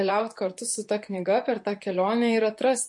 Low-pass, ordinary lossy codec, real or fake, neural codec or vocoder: 10.8 kHz; MP3, 48 kbps; fake; vocoder, 44.1 kHz, 128 mel bands, Pupu-Vocoder